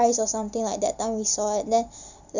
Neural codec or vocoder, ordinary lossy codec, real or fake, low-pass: none; none; real; 7.2 kHz